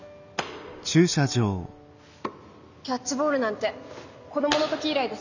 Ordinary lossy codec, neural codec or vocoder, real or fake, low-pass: none; none; real; 7.2 kHz